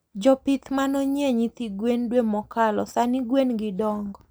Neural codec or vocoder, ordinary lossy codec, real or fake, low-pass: none; none; real; none